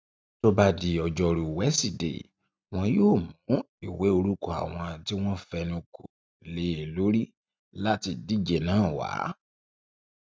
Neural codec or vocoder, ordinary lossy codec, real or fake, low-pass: none; none; real; none